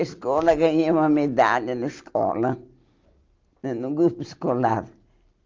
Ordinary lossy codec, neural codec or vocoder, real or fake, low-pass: Opus, 32 kbps; none; real; 7.2 kHz